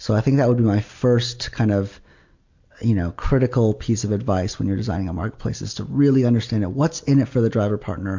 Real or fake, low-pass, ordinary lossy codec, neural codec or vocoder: real; 7.2 kHz; MP3, 48 kbps; none